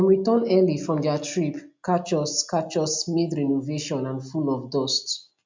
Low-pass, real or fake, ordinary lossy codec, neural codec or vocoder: 7.2 kHz; real; MP3, 64 kbps; none